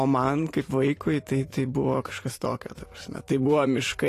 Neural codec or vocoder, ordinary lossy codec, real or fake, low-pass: vocoder, 44.1 kHz, 128 mel bands every 512 samples, BigVGAN v2; AAC, 48 kbps; fake; 14.4 kHz